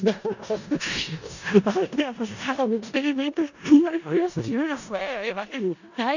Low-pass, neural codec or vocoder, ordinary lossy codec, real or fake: 7.2 kHz; codec, 16 kHz in and 24 kHz out, 0.4 kbps, LongCat-Audio-Codec, four codebook decoder; none; fake